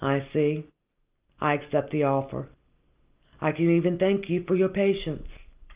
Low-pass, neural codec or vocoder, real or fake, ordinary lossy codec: 3.6 kHz; none; real; Opus, 24 kbps